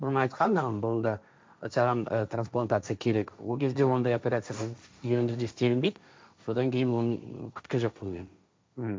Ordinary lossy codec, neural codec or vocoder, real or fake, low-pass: none; codec, 16 kHz, 1.1 kbps, Voila-Tokenizer; fake; none